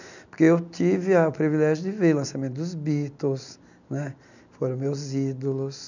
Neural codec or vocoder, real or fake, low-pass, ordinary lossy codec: none; real; 7.2 kHz; none